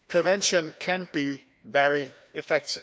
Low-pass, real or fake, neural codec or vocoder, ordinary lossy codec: none; fake; codec, 16 kHz, 1 kbps, FreqCodec, larger model; none